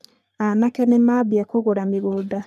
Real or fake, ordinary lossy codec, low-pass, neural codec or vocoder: fake; none; 14.4 kHz; codec, 44.1 kHz, 3.4 kbps, Pupu-Codec